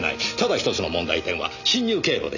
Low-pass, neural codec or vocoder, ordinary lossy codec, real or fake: 7.2 kHz; none; none; real